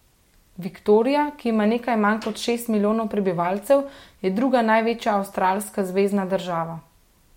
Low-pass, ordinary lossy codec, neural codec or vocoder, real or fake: 19.8 kHz; MP3, 64 kbps; none; real